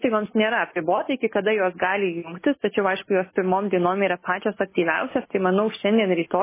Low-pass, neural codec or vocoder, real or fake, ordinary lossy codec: 3.6 kHz; none; real; MP3, 16 kbps